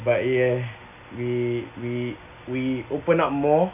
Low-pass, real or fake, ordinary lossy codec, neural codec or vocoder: 3.6 kHz; real; none; none